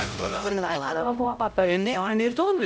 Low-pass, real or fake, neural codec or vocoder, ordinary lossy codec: none; fake; codec, 16 kHz, 0.5 kbps, X-Codec, HuBERT features, trained on LibriSpeech; none